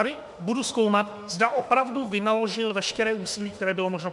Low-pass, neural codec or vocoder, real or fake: 10.8 kHz; autoencoder, 48 kHz, 32 numbers a frame, DAC-VAE, trained on Japanese speech; fake